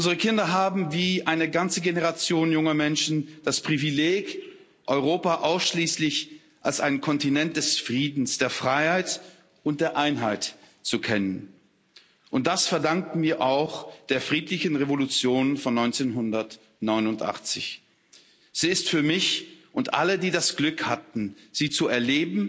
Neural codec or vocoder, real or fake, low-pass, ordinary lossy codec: none; real; none; none